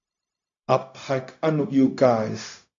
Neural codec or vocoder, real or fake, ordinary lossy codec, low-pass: codec, 16 kHz, 0.4 kbps, LongCat-Audio-Codec; fake; AAC, 64 kbps; 7.2 kHz